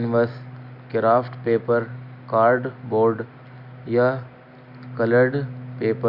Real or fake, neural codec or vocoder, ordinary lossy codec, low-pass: real; none; none; 5.4 kHz